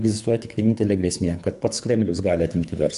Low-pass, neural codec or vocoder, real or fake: 10.8 kHz; codec, 24 kHz, 3 kbps, HILCodec; fake